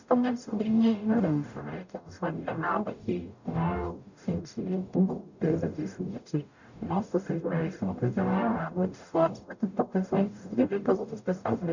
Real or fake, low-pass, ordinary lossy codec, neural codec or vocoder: fake; 7.2 kHz; none; codec, 44.1 kHz, 0.9 kbps, DAC